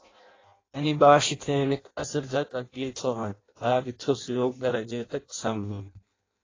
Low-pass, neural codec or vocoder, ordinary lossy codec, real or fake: 7.2 kHz; codec, 16 kHz in and 24 kHz out, 0.6 kbps, FireRedTTS-2 codec; AAC, 32 kbps; fake